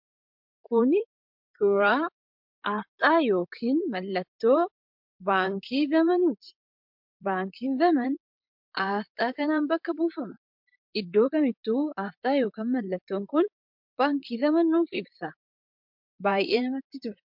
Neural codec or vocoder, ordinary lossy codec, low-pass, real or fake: vocoder, 44.1 kHz, 128 mel bands, Pupu-Vocoder; MP3, 48 kbps; 5.4 kHz; fake